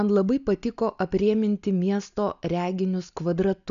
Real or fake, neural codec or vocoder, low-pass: real; none; 7.2 kHz